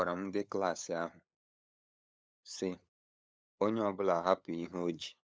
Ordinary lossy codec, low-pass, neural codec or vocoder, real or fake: none; none; codec, 16 kHz, 8 kbps, FunCodec, trained on LibriTTS, 25 frames a second; fake